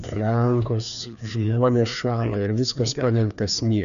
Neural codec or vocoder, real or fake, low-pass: codec, 16 kHz, 2 kbps, FreqCodec, larger model; fake; 7.2 kHz